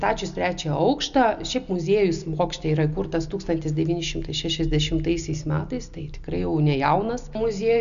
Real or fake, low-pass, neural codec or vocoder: real; 7.2 kHz; none